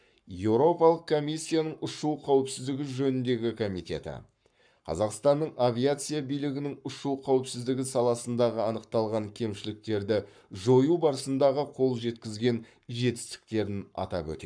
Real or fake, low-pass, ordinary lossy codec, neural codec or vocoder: fake; 9.9 kHz; none; codec, 44.1 kHz, 7.8 kbps, Pupu-Codec